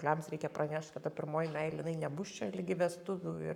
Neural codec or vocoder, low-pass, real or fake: codec, 44.1 kHz, 7.8 kbps, Pupu-Codec; 19.8 kHz; fake